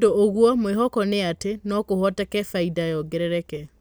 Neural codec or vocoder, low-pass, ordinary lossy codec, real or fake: none; none; none; real